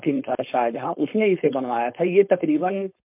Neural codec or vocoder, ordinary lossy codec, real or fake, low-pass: codec, 16 kHz, 4.8 kbps, FACodec; MP3, 32 kbps; fake; 3.6 kHz